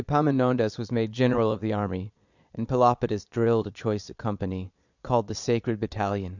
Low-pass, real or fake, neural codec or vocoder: 7.2 kHz; fake; vocoder, 22.05 kHz, 80 mel bands, Vocos